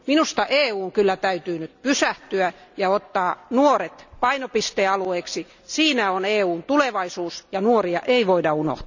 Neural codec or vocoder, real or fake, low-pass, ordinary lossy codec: none; real; 7.2 kHz; none